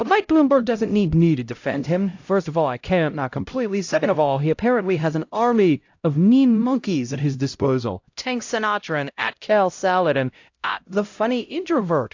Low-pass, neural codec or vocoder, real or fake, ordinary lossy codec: 7.2 kHz; codec, 16 kHz, 0.5 kbps, X-Codec, HuBERT features, trained on LibriSpeech; fake; AAC, 48 kbps